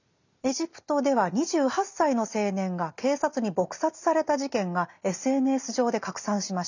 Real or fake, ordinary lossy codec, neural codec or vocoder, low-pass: real; none; none; 7.2 kHz